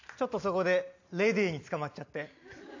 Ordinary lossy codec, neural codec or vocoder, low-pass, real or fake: AAC, 48 kbps; none; 7.2 kHz; real